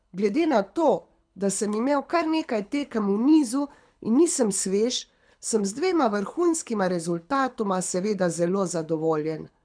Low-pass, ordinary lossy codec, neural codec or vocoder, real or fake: 9.9 kHz; none; codec, 24 kHz, 6 kbps, HILCodec; fake